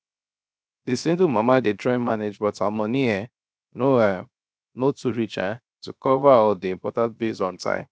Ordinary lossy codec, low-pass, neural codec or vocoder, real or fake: none; none; codec, 16 kHz, 0.7 kbps, FocalCodec; fake